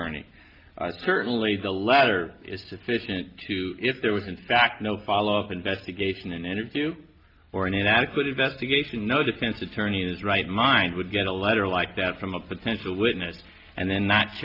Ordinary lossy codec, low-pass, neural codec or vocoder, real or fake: Opus, 32 kbps; 5.4 kHz; none; real